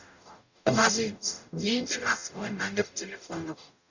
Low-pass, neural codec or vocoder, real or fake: 7.2 kHz; codec, 44.1 kHz, 0.9 kbps, DAC; fake